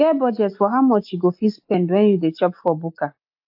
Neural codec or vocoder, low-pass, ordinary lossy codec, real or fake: none; 5.4 kHz; AAC, 48 kbps; real